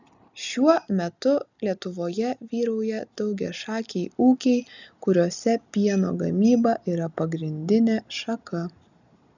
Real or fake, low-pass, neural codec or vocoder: real; 7.2 kHz; none